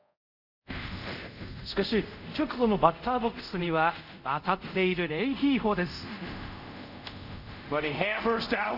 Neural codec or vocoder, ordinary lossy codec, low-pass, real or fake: codec, 24 kHz, 0.5 kbps, DualCodec; none; 5.4 kHz; fake